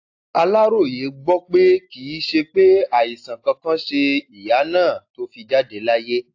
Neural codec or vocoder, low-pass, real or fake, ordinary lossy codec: none; 7.2 kHz; real; AAC, 48 kbps